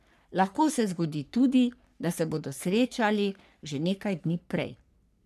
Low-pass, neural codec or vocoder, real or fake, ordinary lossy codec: 14.4 kHz; codec, 44.1 kHz, 3.4 kbps, Pupu-Codec; fake; none